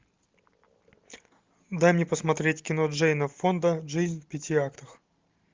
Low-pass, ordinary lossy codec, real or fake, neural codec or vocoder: 7.2 kHz; Opus, 24 kbps; real; none